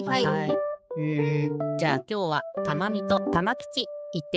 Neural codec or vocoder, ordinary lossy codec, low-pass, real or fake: codec, 16 kHz, 4 kbps, X-Codec, HuBERT features, trained on balanced general audio; none; none; fake